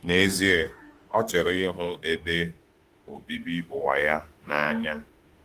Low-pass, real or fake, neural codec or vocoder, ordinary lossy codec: 14.4 kHz; fake; autoencoder, 48 kHz, 32 numbers a frame, DAC-VAE, trained on Japanese speech; Opus, 24 kbps